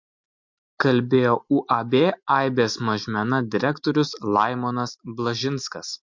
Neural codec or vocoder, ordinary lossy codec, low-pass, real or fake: none; MP3, 48 kbps; 7.2 kHz; real